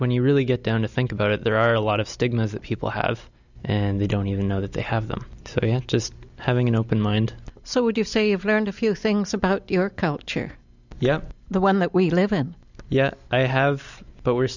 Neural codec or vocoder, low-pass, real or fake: none; 7.2 kHz; real